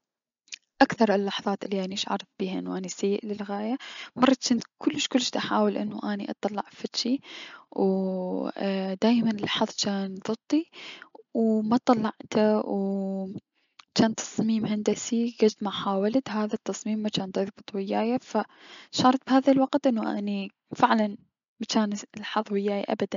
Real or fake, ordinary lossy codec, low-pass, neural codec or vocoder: real; MP3, 64 kbps; 7.2 kHz; none